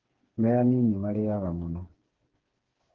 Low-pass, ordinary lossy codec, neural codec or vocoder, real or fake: 7.2 kHz; Opus, 16 kbps; codec, 16 kHz, 4 kbps, FreqCodec, smaller model; fake